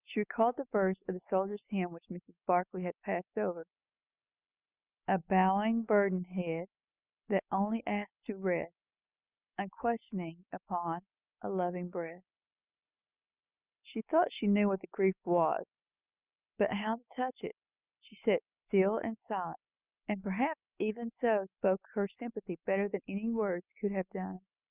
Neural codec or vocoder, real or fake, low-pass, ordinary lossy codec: none; real; 3.6 kHz; Opus, 64 kbps